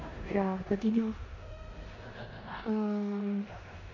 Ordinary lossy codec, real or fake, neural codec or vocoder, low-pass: AAC, 48 kbps; fake; codec, 16 kHz in and 24 kHz out, 0.9 kbps, LongCat-Audio-Codec, four codebook decoder; 7.2 kHz